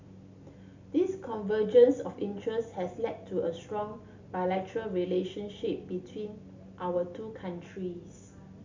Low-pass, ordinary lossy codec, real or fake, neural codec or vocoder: 7.2 kHz; MP3, 64 kbps; real; none